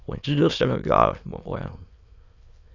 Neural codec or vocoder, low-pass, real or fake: autoencoder, 22.05 kHz, a latent of 192 numbers a frame, VITS, trained on many speakers; 7.2 kHz; fake